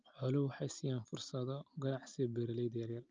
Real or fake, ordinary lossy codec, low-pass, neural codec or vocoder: real; Opus, 24 kbps; 7.2 kHz; none